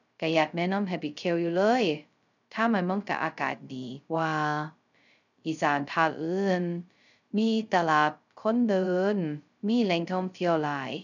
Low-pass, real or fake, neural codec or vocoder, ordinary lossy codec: 7.2 kHz; fake; codec, 16 kHz, 0.2 kbps, FocalCodec; none